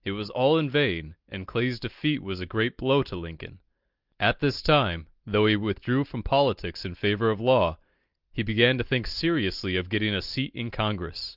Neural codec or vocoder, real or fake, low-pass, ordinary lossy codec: none; real; 5.4 kHz; Opus, 24 kbps